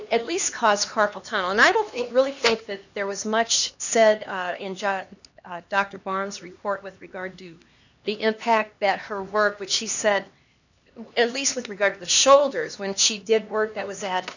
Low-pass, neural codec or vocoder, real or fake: 7.2 kHz; codec, 16 kHz, 2 kbps, X-Codec, HuBERT features, trained on LibriSpeech; fake